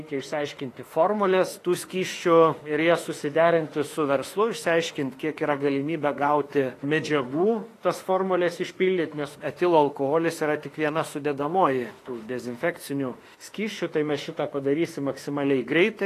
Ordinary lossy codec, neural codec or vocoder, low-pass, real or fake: AAC, 48 kbps; autoencoder, 48 kHz, 32 numbers a frame, DAC-VAE, trained on Japanese speech; 14.4 kHz; fake